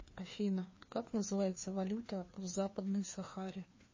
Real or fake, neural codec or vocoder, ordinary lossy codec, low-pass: fake; codec, 16 kHz, 2 kbps, FreqCodec, larger model; MP3, 32 kbps; 7.2 kHz